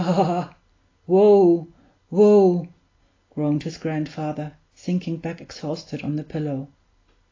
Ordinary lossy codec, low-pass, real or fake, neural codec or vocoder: AAC, 32 kbps; 7.2 kHz; real; none